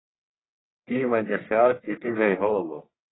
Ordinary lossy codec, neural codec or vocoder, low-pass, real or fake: AAC, 16 kbps; codec, 44.1 kHz, 1.7 kbps, Pupu-Codec; 7.2 kHz; fake